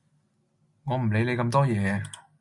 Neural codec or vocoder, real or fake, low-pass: none; real; 10.8 kHz